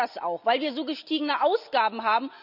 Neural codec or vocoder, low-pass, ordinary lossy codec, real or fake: none; 5.4 kHz; none; real